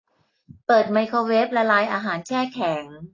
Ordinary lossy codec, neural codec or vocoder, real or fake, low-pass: AAC, 32 kbps; none; real; 7.2 kHz